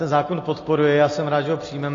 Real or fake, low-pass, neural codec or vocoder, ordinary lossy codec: real; 7.2 kHz; none; AAC, 32 kbps